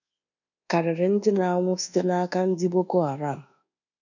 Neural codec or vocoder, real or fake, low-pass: codec, 24 kHz, 1.2 kbps, DualCodec; fake; 7.2 kHz